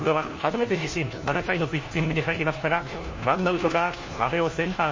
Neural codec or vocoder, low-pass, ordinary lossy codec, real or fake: codec, 16 kHz, 1 kbps, FunCodec, trained on LibriTTS, 50 frames a second; 7.2 kHz; MP3, 32 kbps; fake